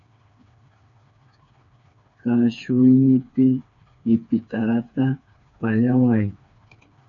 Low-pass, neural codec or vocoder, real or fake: 7.2 kHz; codec, 16 kHz, 4 kbps, FreqCodec, smaller model; fake